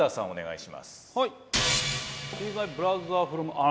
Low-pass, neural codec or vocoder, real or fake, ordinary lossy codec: none; none; real; none